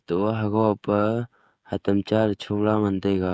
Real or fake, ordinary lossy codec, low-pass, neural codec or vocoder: fake; none; none; codec, 16 kHz, 16 kbps, FreqCodec, smaller model